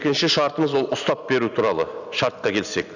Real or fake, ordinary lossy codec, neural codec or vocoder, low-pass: real; none; none; 7.2 kHz